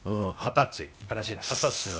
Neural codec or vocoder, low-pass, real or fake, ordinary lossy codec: codec, 16 kHz, 0.8 kbps, ZipCodec; none; fake; none